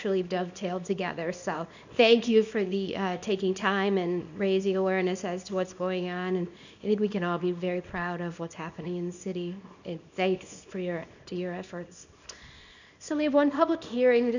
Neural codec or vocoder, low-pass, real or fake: codec, 24 kHz, 0.9 kbps, WavTokenizer, small release; 7.2 kHz; fake